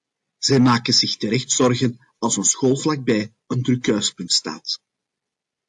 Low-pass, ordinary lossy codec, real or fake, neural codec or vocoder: 10.8 kHz; AAC, 64 kbps; real; none